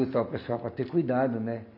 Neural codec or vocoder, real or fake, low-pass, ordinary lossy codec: none; real; 5.4 kHz; none